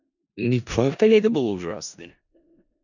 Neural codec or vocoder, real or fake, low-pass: codec, 16 kHz in and 24 kHz out, 0.4 kbps, LongCat-Audio-Codec, four codebook decoder; fake; 7.2 kHz